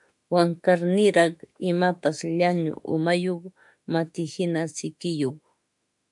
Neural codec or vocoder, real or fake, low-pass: autoencoder, 48 kHz, 32 numbers a frame, DAC-VAE, trained on Japanese speech; fake; 10.8 kHz